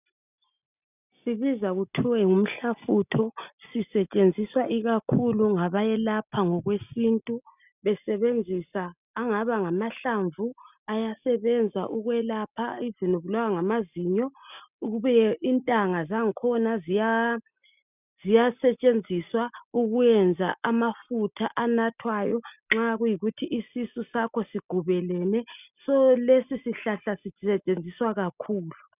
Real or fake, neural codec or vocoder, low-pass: real; none; 3.6 kHz